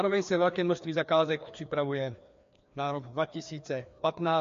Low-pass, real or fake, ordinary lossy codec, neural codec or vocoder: 7.2 kHz; fake; MP3, 48 kbps; codec, 16 kHz, 2 kbps, FreqCodec, larger model